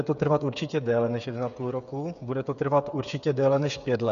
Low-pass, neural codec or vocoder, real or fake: 7.2 kHz; codec, 16 kHz, 8 kbps, FreqCodec, smaller model; fake